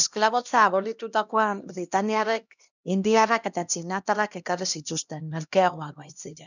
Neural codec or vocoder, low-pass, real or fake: codec, 16 kHz, 1 kbps, X-Codec, HuBERT features, trained on LibriSpeech; 7.2 kHz; fake